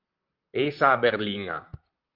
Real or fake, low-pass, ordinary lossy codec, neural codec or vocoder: fake; 5.4 kHz; Opus, 32 kbps; vocoder, 44.1 kHz, 128 mel bands, Pupu-Vocoder